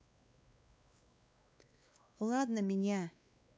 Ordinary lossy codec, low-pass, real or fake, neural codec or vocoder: none; none; fake; codec, 16 kHz, 2 kbps, X-Codec, WavLM features, trained on Multilingual LibriSpeech